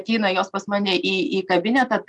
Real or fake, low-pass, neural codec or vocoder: real; 10.8 kHz; none